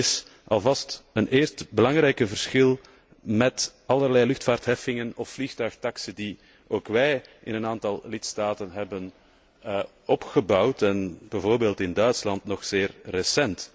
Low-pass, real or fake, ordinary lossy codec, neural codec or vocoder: none; real; none; none